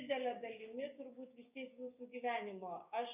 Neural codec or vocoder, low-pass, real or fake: codec, 16 kHz, 6 kbps, DAC; 3.6 kHz; fake